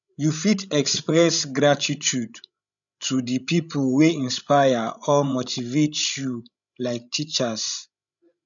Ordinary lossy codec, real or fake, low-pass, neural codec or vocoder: none; fake; 7.2 kHz; codec, 16 kHz, 16 kbps, FreqCodec, larger model